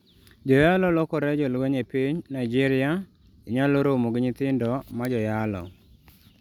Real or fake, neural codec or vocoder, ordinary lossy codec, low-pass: real; none; none; 19.8 kHz